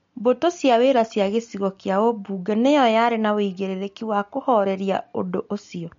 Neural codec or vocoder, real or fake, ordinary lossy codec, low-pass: none; real; MP3, 48 kbps; 7.2 kHz